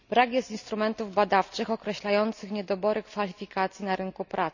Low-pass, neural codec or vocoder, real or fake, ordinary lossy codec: 7.2 kHz; none; real; none